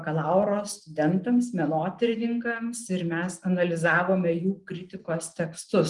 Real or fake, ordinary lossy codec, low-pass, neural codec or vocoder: real; Opus, 24 kbps; 10.8 kHz; none